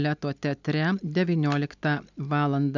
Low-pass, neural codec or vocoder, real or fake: 7.2 kHz; none; real